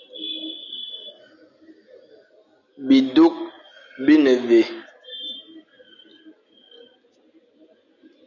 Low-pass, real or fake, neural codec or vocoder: 7.2 kHz; real; none